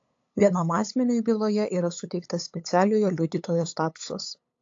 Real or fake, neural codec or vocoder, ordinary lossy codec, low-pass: fake; codec, 16 kHz, 8 kbps, FunCodec, trained on LibriTTS, 25 frames a second; AAC, 48 kbps; 7.2 kHz